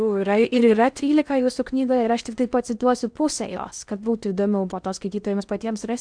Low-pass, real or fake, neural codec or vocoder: 9.9 kHz; fake; codec, 16 kHz in and 24 kHz out, 0.6 kbps, FocalCodec, streaming, 4096 codes